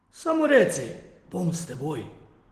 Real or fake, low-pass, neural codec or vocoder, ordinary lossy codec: real; 14.4 kHz; none; Opus, 16 kbps